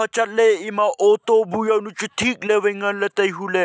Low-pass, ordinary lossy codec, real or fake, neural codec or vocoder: none; none; real; none